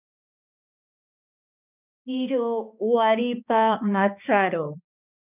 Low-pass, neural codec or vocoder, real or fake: 3.6 kHz; codec, 16 kHz, 2 kbps, X-Codec, HuBERT features, trained on balanced general audio; fake